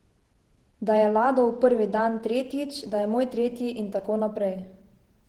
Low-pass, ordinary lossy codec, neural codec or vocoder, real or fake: 19.8 kHz; Opus, 16 kbps; vocoder, 48 kHz, 128 mel bands, Vocos; fake